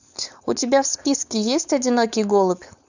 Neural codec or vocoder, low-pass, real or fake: codec, 16 kHz, 4.8 kbps, FACodec; 7.2 kHz; fake